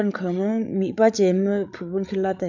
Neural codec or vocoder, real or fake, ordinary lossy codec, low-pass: codec, 16 kHz, 8 kbps, FreqCodec, larger model; fake; none; 7.2 kHz